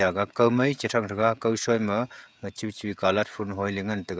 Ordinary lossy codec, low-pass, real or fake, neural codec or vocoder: none; none; fake; codec, 16 kHz, 16 kbps, FreqCodec, smaller model